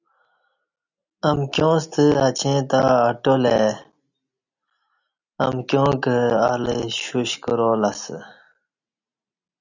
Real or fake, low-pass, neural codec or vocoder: real; 7.2 kHz; none